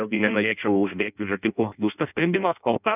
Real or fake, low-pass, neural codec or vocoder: fake; 3.6 kHz; codec, 16 kHz in and 24 kHz out, 0.6 kbps, FireRedTTS-2 codec